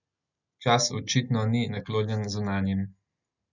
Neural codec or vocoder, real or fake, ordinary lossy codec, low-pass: none; real; none; 7.2 kHz